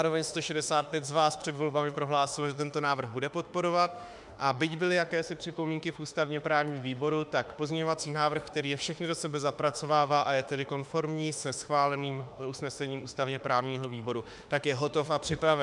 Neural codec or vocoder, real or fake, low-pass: autoencoder, 48 kHz, 32 numbers a frame, DAC-VAE, trained on Japanese speech; fake; 10.8 kHz